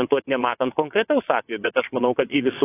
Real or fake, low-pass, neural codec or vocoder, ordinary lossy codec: fake; 3.6 kHz; vocoder, 22.05 kHz, 80 mel bands, WaveNeXt; AAC, 16 kbps